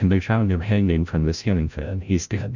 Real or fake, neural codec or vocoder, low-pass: fake; codec, 16 kHz, 0.5 kbps, FreqCodec, larger model; 7.2 kHz